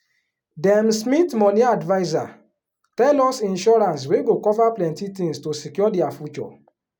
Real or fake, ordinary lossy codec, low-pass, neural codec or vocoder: real; none; 19.8 kHz; none